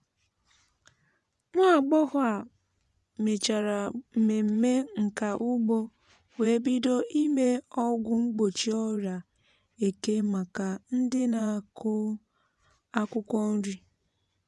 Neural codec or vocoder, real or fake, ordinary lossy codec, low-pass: vocoder, 24 kHz, 100 mel bands, Vocos; fake; none; none